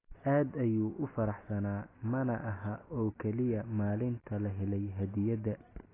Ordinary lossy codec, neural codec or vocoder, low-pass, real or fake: AAC, 16 kbps; none; 3.6 kHz; real